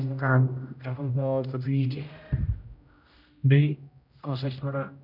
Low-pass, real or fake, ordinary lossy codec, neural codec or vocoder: 5.4 kHz; fake; none; codec, 16 kHz, 0.5 kbps, X-Codec, HuBERT features, trained on general audio